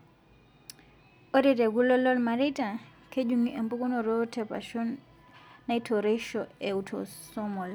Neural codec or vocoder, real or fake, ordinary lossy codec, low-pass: none; real; none; none